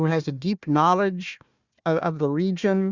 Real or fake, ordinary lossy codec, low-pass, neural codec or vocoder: fake; Opus, 64 kbps; 7.2 kHz; codec, 16 kHz, 1 kbps, FunCodec, trained on Chinese and English, 50 frames a second